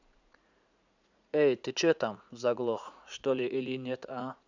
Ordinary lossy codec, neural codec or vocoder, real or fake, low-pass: none; vocoder, 44.1 kHz, 80 mel bands, Vocos; fake; 7.2 kHz